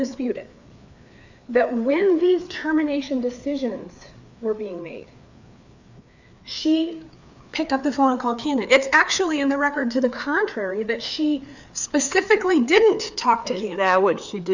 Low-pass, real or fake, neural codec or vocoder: 7.2 kHz; fake; codec, 16 kHz, 4 kbps, FreqCodec, larger model